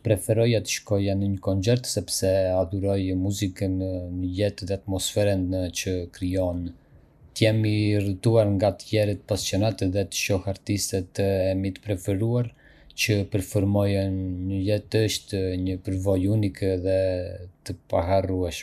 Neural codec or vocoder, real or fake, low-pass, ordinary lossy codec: none; real; 14.4 kHz; none